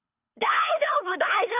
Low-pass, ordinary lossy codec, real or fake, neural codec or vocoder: 3.6 kHz; none; fake; codec, 24 kHz, 3 kbps, HILCodec